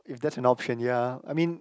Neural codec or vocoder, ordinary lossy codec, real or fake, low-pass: none; none; real; none